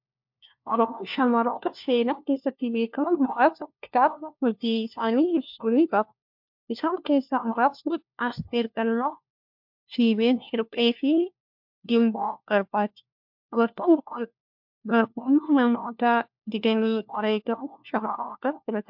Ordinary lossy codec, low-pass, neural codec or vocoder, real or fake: MP3, 48 kbps; 5.4 kHz; codec, 16 kHz, 1 kbps, FunCodec, trained on LibriTTS, 50 frames a second; fake